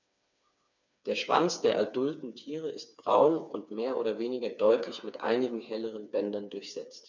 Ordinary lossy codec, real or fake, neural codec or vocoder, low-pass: none; fake; codec, 16 kHz, 4 kbps, FreqCodec, smaller model; 7.2 kHz